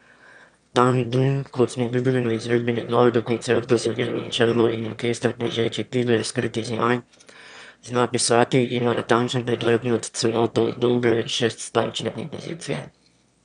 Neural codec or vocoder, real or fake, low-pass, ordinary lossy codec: autoencoder, 22.05 kHz, a latent of 192 numbers a frame, VITS, trained on one speaker; fake; 9.9 kHz; none